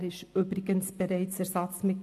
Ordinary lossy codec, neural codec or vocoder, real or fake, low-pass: AAC, 64 kbps; none; real; 14.4 kHz